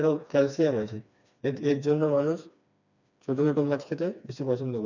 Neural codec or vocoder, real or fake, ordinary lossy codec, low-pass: codec, 16 kHz, 2 kbps, FreqCodec, smaller model; fake; none; 7.2 kHz